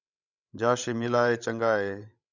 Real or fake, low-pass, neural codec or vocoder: fake; 7.2 kHz; codec, 16 kHz, 16 kbps, FreqCodec, larger model